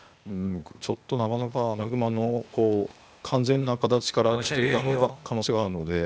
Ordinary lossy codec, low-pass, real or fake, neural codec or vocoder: none; none; fake; codec, 16 kHz, 0.8 kbps, ZipCodec